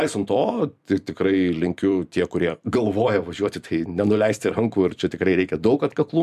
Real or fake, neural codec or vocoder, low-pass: fake; vocoder, 44.1 kHz, 128 mel bands every 256 samples, BigVGAN v2; 14.4 kHz